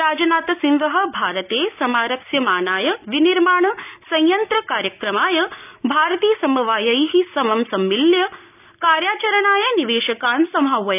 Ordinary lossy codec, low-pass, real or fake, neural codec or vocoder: none; 3.6 kHz; real; none